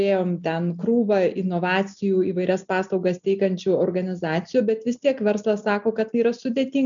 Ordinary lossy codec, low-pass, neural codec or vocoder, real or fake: MP3, 96 kbps; 7.2 kHz; none; real